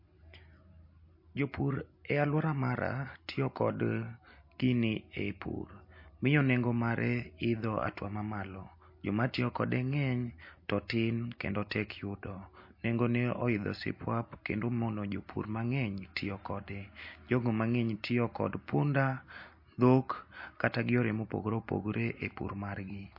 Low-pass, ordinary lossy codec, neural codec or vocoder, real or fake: 5.4 kHz; MP3, 32 kbps; none; real